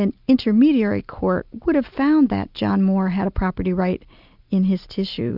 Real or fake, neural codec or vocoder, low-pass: real; none; 5.4 kHz